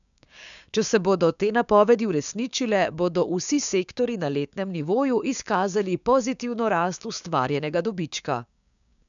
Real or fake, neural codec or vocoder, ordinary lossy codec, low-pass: fake; codec, 16 kHz, 6 kbps, DAC; none; 7.2 kHz